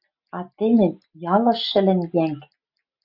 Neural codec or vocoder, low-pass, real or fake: none; 5.4 kHz; real